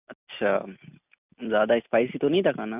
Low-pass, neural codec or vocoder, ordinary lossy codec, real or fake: 3.6 kHz; none; none; real